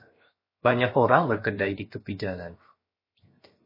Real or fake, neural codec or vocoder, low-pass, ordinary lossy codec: fake; codec, 16 kHz, 0.7 kbps, FocalCodec; 5.4 kHz; MP3, 24 kbps